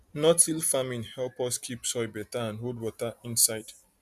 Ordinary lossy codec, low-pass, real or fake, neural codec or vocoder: none; 14.4 kHz; real; none